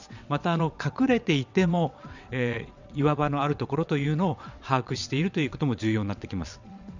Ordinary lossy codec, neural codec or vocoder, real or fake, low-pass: none; vocoder, 22.05 kHz, 80 mel bands, WaveNeXt; fake; 7.2 kHz